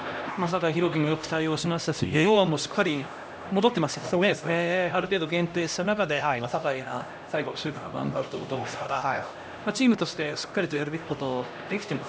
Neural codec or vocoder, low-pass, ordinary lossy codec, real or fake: codec, 16 kHz, 1 kbps, X-Codec, HuBERT features, trained on LibriSpeech; none; none; fake